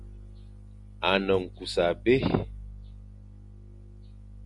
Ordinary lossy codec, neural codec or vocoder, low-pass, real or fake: MP3, 64 kbps; none; 10.8 kHz; real